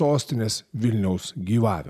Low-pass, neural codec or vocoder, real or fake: 14.4 kHz; none; real